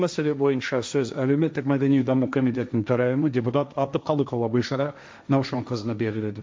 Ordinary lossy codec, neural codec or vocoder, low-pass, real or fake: none; codec, 16 kHz, 1.1 kbps, Voila-Tokenizer; none; fake